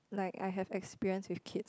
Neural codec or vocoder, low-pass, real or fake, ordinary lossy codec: none; none; real; none